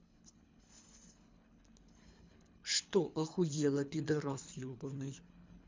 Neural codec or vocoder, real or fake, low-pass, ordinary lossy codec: codec, 24 kHz, 3 kbps, HILCodec; fake; 7.2 kHz; MP3, 64 kbps